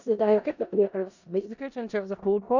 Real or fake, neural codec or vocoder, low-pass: fake; codec, 16 kHz in and 24 kHz out, 0.4 kbps, LongCat-Audio-Codec, four codebook decoder; 7.2 kHz